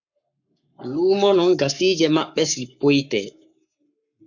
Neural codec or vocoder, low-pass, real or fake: codec, 44.1 kHz, 7.8 kbps, Pupu-Codec; 7.2 kHz; fake